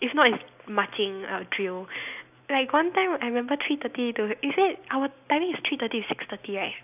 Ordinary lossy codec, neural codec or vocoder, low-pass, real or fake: none; none; 3.6 kHz; real